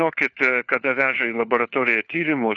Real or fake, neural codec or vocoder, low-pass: fake; codec, 16 kHz, 4 kbps, FunCodec, trained on LibriTTS, 50 frames a second; 7.2 kHz